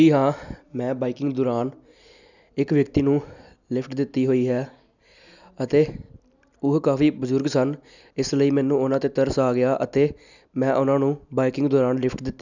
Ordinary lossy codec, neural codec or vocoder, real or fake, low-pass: none; none; real; 7.2 kHz